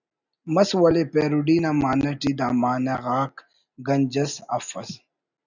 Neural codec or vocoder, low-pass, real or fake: none; 7.2 kHz; real